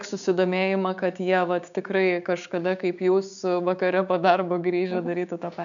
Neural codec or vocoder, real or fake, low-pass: codec, 16 kHz, 6 kbps, DAC; fake; 7.2 kHz